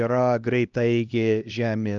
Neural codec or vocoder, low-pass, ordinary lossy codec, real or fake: codec, 16 kHz, 1 kbps, X-Codec, HuBERT features, trained on LibriSpeech; 7.2 kHz; Opus, 24 kbps; fake